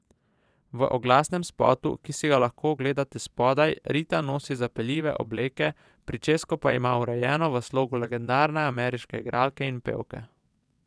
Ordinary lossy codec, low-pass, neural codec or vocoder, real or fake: none; none; vocoder, 22.05 kHz, 80 mel bands, WaveNeXt; fake